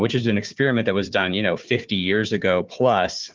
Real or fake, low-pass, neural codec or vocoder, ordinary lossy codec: fake; 7.2 kHz; codec, 16 kHz, 4 kbps, FunCodec, trained on Chinese and English, 50 frames a second; Opus, 24 kbps